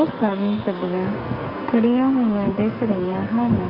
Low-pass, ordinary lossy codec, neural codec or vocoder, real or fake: 5.4 kHz; Opus, 32 kbps; codec, 44.1 kHz, 2.6 kbps, SNAC; fake